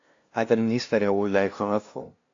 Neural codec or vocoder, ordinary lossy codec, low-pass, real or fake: codec, 16 kHz, 0.5 kbps, FunCodec, trained on LibriTTS, 25 frames a second; AAC, 48 kbps; 7.2 kHz; fake